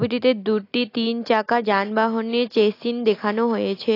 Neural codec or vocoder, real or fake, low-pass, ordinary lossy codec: none; real; 5.4 kHz; AAC, 32 kbps